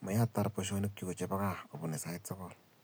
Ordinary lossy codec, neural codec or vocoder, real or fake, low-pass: none; none; real; none